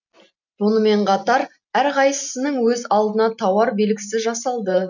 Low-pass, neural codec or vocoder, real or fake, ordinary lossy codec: 7.2 kHz; none; real; none